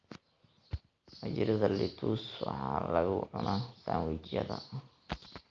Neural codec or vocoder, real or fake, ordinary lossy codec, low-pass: none; real; Opus, 24 kbps; 7.2 kHz